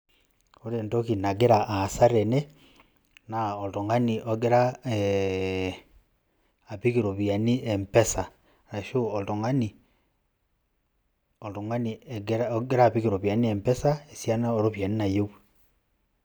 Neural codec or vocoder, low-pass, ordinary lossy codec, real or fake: none; none; none; real